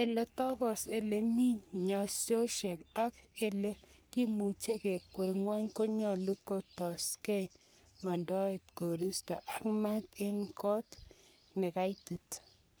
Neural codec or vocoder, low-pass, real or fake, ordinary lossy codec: codec, 44.1 kHz, 3.4 kbps, Pupu-Codec; none; fake; none